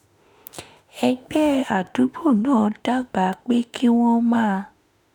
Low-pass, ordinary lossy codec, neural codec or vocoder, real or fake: none; none; autoencoder, 48 kHz, 32 numbers a frame, DAC-VAE, trained on Japanese speech; fake